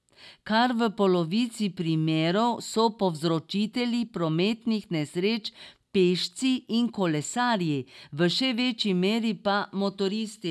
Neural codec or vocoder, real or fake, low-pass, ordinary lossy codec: none; real; none; none